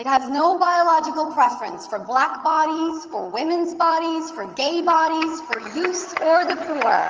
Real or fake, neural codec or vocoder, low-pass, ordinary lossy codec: fake; vocoder, 22.05 kHz, 80 mel bands, HiFi-GAN; 7.2 kHz; Opus, 24 kbps